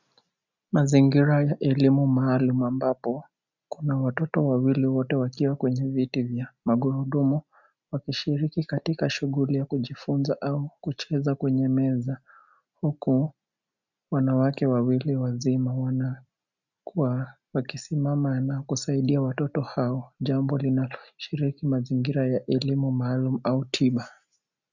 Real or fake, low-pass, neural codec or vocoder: real; 7.2 kHz; none